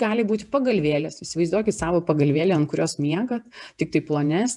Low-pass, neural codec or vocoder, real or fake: 10.8 kHz; vocoder, 48 kHz, 128 mel bands, Vocos; fake